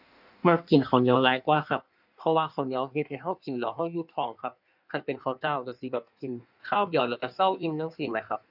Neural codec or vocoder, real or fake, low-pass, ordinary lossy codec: codec, 16 kHz in and 24 kHz out, 1.1 kbps, FireRedTTS-2 codec; fake; 5.4 kHz; none